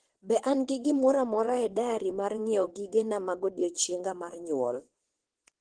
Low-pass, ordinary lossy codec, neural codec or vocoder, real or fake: 9.9 kHz; Opus, 16 kbps; vocoder, 22.05 kHz, 80 mel bands, WaveNeXt; fake